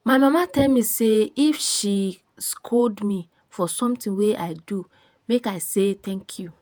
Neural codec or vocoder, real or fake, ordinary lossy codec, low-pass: vocoder, 48 kHz, 128 mel bands, Vocos; fake; none; none